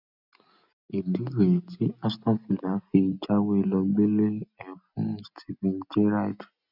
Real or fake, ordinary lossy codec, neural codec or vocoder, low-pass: real; none; none; 5.4 kHz